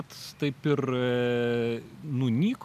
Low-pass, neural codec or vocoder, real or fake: 14.4 kHz; none; real